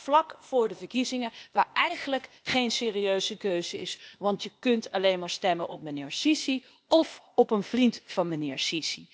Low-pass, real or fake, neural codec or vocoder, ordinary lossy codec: none; fake; codec, 16 kHz, 0.8 kbps, ZipCodec; none